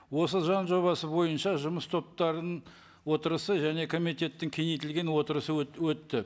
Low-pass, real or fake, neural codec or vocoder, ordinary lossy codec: none; real; none; none